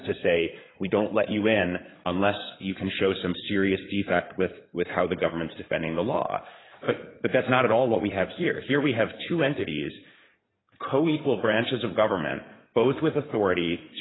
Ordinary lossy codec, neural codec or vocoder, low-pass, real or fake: AAC, 16 kbps; codec, 16 kHz in and 24 kHz out, 2.2 kbps, FireRedTTS-2 codec; 7.2 kHz; fake